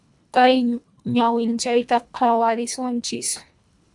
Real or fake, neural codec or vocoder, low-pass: fake; codec, 24 kHz, 1.5 kbps, HILCodec; 10.8 kHz